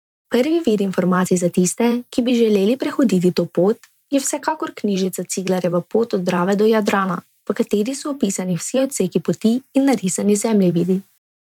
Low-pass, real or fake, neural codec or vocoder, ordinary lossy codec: 19.8 kHz; fake; vocoder, 44.1 kHz, 128 mel bands every 512 samples, BigVGAN v2; none